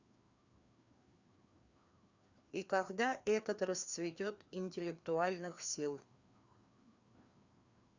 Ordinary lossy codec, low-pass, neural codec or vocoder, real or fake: Opus, 64 kbps; 7.2 kHz; codec, 16 kHz, 2 kbps, FreqCodec, larger model; fake